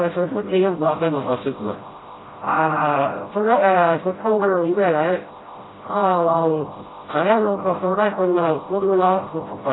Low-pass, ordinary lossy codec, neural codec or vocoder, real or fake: 7.2 kHz; AAC, 16 kbps; codec, 16 kHz, 0.5 kbps, FreqCodec, smaller model; fake